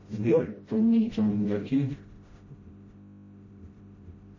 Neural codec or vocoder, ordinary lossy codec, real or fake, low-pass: codec, 16 kHz, 0.5 kbps, FreqCodec, smaller model; MP3, 32 kbps; fake; 7.2 kHz